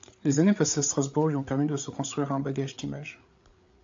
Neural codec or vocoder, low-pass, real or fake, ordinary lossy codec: codec, 16 kHz, 8 kbps, FreqCodec, larger model; 7.2 kHz; fake; AAC, 64 kbps